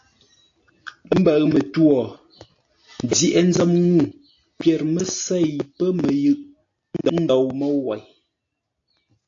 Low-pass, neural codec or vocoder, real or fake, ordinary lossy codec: 7.2 kHz; none; real; AAC, 48 kbps